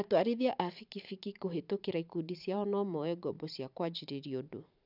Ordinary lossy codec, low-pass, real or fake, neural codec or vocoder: none; 5.4 kHz; fake; vocoder, 44.1 kHz, 128 mel bands every 512 samples, BigVGAN v2